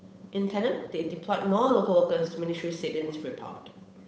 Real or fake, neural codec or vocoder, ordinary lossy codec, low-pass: fake; codec, 16 kHz, 8 kbps, FunCodec, trained on Chinese and English, 25 frames a second; none; none